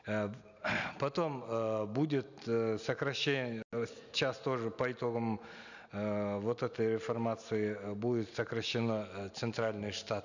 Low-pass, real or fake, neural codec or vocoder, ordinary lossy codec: 7.2 kHz; real; none; none